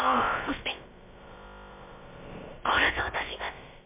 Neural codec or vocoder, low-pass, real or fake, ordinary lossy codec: codec, 16 kHz, about 1 kbps, DyCAST, with the encoder's durations; 3.6 kHz; fake; MP3, 32 kbps